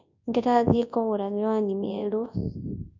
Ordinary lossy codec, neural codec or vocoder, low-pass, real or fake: none; codec, 24 kHz, 0.9 kbps, WavTokenizer, large speech release; 7.2 kHz; fake